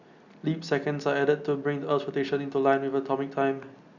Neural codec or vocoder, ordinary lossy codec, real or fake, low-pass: none; Opus, 64 kbps; real; 7.2 kHz